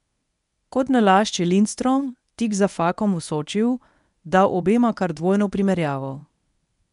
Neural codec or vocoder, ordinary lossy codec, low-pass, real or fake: codec, 24 kHz, 0.9 kbps, WavTokenizer, medium speech release version 1; none; 10.8 kHz; fake